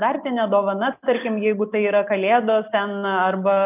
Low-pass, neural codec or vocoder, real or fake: 3.6 kHz; none; real